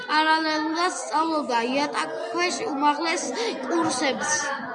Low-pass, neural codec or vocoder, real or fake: 9.9 kHz; none; real